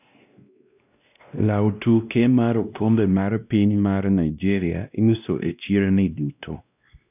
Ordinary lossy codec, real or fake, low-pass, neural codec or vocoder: none; fake; 3.6 kHz; codec, 16 kHz, 1 kbps, X-Codec, WavLM features, trained on Multilingual LibriSpeech